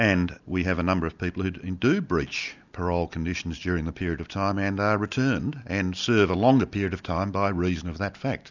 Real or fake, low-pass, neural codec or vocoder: real; 7.2 kHz; none